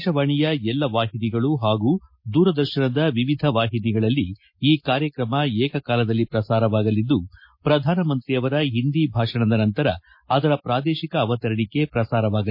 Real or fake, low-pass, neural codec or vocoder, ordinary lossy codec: real; 5.4 kHz; none; MP3, 32 kbps